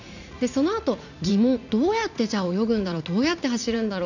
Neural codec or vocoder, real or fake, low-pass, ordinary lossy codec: vocoder, 44.1 kHz, 80 mel bands, Vocos; fake; 7.2 kHz; none